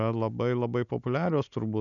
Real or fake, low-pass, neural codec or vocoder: real; 7.2 kHz; none